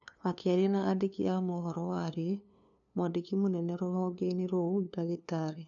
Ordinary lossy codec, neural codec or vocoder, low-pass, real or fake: none; codec, 16 kHz, 2 kbps, FunCodec, trained on LibriTTS, 25 frames a second; 7.2 kHz; fake